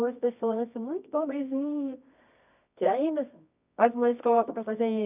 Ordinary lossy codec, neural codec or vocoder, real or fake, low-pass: none; codec, 24 kHz, 0.9 kbps, WavTokenizer, medium music audio release; fake; 3.6 kHz